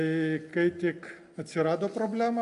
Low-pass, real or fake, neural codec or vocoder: 10.8 kHz; real; none